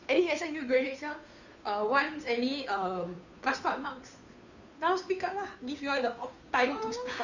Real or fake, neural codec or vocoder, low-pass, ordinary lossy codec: fake; codec, 16 kHz, 2 kbps, FunCodec, trained on Chinese and English, 25 frames a second; 7.2 kHz; none